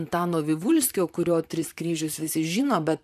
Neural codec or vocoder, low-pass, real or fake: vocoder, 44.1 kHz, 128 mel bands, Pupu-Vocoder; 14.4 kHz; fake